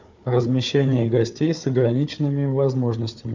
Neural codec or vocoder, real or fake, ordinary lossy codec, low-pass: codec, 16 kHz, 4 kbps, FunCodec, trained on Chinese and English, 50 frames a second; fake; MP3, 64 kbps; 7.2 kHz